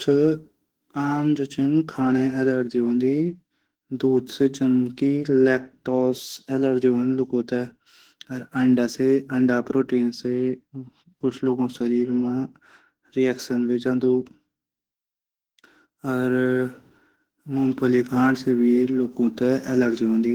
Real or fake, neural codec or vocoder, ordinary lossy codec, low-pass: fake; autoencoder, 48 kHz, 32 numbers a frame, DAC-VAE, trained on Japanese speech; Opus, 16 kbps; 19.8 kHz